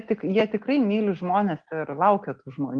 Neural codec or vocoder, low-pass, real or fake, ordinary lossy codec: none; 9.9 kHz; real; Opus, 24 kbps